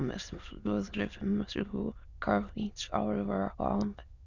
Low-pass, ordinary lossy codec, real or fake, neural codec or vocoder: 7.2 kHz; none; fake; autoencoder, 22.05 kHz, a latent of 192 numbers a frame, VITS, trained on many speakers